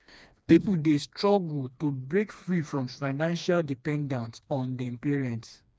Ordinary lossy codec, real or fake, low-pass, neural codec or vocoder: none; fake; none; codec, 16 kHz, 2 kbps, FreqCodec, smaller model